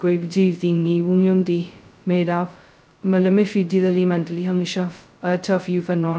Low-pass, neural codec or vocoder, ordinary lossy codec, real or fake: none; codec, 16 kHz, 0.2 kbps, FocalCodec; none; fake